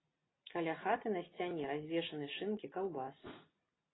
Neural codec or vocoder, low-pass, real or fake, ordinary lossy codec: none; 7.2 kHz; real; AAC, 16 kbps